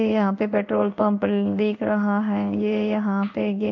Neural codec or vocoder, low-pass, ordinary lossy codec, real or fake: codec, 16 kHz in and 24 kHz out, 1 kbps, XY-Tokenizer; 7.2 kHz; MP3, 48 kbps; fake